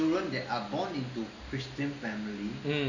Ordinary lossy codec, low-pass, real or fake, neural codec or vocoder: none; 7.2 kHz; real; none